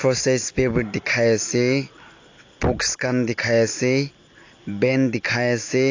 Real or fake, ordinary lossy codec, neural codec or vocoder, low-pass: real; AAC, 48 kbps; none; 7.2 kHz